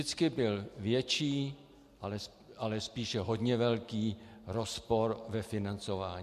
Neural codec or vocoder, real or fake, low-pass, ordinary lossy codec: none; real; 14.4 kHz; MP3, 64 kbps